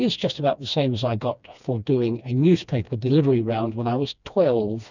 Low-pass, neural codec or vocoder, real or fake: 7.2 kHz; codec, 16 kHz, 2 kbps, FreqCodec, smaller model; fake